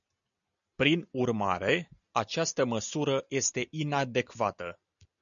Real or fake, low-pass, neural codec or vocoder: real; 7.2 kHz; none